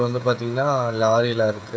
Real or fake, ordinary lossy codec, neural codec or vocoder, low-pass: fake; none; codec, 16 kHz, 16 kbps, FreqCodec, smaller model; none